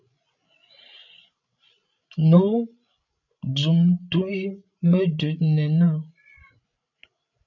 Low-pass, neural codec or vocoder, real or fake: 7.2 kHz; codec, 16 kHz, 16 kbps, FreqCodec, larger model; fake